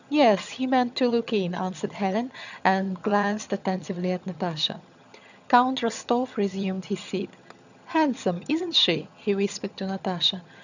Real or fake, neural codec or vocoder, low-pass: fake; vocoder, 22.05 kHz, 80 mel bands, HiFi-GAN; 7.2 kHz